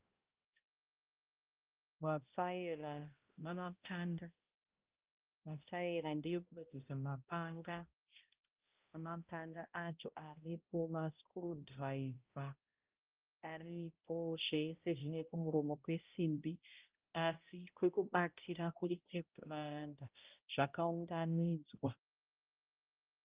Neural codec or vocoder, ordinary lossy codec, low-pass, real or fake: codec, 16 kHz, 0.5 kbps, X-Codec, HuBERT features, trained on balanced general audio; Opus, 24 kbps; 3.6 kHz; fake